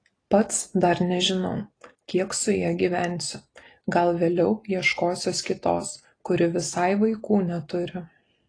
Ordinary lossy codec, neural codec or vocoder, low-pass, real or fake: AAC, 32 kbps; none; 9.9 kHz; real